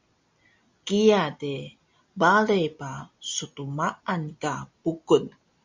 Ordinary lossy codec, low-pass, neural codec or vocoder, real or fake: MP3, 64 kbps; 7.2 kHz; none; real